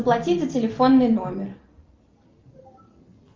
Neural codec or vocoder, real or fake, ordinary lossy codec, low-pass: none; real; Opus, 24 kbps; 7.2 kHz